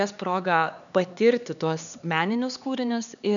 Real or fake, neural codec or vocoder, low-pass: fake; codec, 16 kHz, 2 kbps, X-Codec, HuBERT features, trained on LibriSpeech; 7.2 kHz